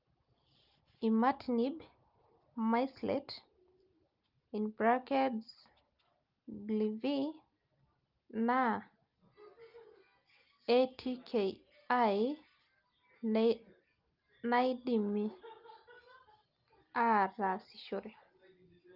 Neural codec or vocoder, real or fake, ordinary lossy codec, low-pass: none; real; Opus, 32 kbps; 5.4 kHz